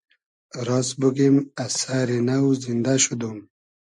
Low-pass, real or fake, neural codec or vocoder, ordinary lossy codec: 10.8 kHz; real; none; MP3, 64 kbps